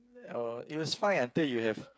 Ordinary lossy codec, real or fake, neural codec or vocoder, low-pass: none; fake; codec, 16 kHz, 8 kbps, FreqCodec, smaller model; none